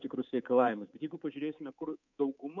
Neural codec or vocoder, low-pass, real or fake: codec, 24 kHz, 3.1 kbps, DualCodec; 7.2 kHz; fake